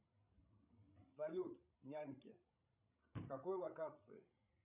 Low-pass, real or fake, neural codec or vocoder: 3.6 kHz; fake; codec, 16 kHz, 16 kbps, FreqCodec, larger model